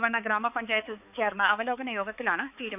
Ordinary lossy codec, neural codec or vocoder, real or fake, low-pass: none; codec, 16 kHz, 4 kbps, X-Codec, HuBERT features, trained on balanced general audio; fake; 3.6 kHz